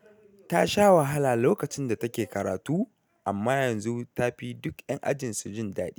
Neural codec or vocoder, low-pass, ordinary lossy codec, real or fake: none; none; none; real